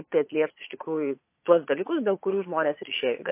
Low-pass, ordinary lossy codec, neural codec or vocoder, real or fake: 3.6 kHz; MP3, 24 kbps; vocoder, 22.05 kHz, 80 mel bands, Vocos; fake